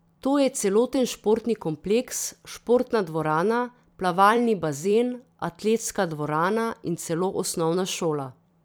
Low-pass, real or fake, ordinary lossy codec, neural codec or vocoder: none; fake; none; vocoder, 44.1 kHz, 128 mel bands every 256 samples, BigVGAN v2